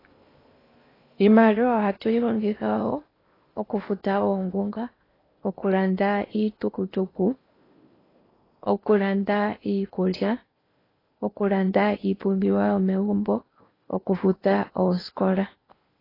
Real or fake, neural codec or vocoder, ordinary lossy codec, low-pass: fake; codec, 16 kHz in and 24 kHz out, 0.8 kbps, FocalCodec, streaming, 65536 codes; AAC, 24 kbps; 5.4 kHz